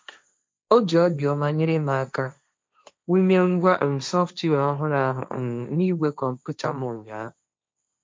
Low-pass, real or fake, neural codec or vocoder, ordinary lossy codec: none; fake; codec, 16 kHz, 1.1 kbps, Voila-Tokenizer; none